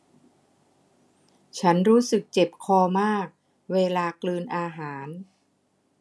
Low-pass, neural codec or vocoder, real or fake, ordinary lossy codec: none; none; real; none